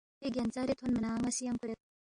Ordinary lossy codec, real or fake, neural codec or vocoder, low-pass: AAC, 64 kbps; real; none; 9.9 kHz